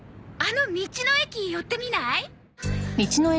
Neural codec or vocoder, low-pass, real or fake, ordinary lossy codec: none; none; real; none